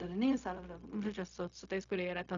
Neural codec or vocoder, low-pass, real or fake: codec, 16 kHz, 0.4 kbps, LongCat-Audio-Codec; 7.2 kHz; fake